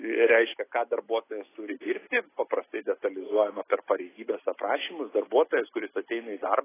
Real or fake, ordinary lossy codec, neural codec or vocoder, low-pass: real; AAC, 16 kbps; none; 3.6 kHz